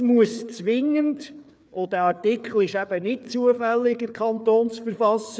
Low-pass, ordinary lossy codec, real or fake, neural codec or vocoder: none; none; fake; codec, 16 kHz, 4 kbps, FreqCodec, larger model